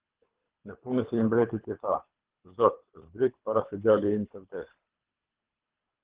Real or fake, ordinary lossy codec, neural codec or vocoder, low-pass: fake; Opus, 16 kbps; codec, 24 kHz, 3 kbps, HILCodec; 3.6 kHz